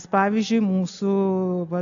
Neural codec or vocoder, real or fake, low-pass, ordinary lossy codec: none; real; 7.2 kHz; AAC, 64 kbps